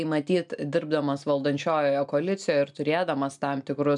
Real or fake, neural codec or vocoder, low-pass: real; none; 10.8 kHz